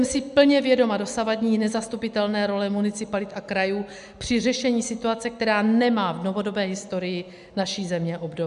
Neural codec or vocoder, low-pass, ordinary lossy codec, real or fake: none; 10.8 kHz; MP3, 96 kbps; real